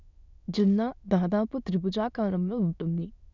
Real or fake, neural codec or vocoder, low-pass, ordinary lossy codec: fake; autoencoder, 22.05 kHz, a latent of 192 numbers a frame, VITS, trained on many speakers; 7.2 kHz; none